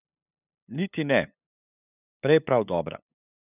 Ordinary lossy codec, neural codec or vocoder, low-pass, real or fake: none; codec, 16 kHz, 8 kbps, FunCodec, trained on LibriTTS, 25 frames a second; 3.6 kHz; fake